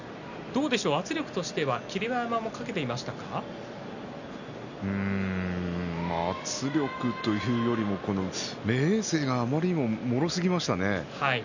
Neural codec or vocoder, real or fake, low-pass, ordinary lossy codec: none; real; 7.2 kHz; none